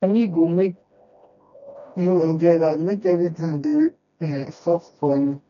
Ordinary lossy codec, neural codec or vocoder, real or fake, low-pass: none; codec, 16 kHz, 1 kbps, FreqCodec, smaller model; fake; 7.2 kHz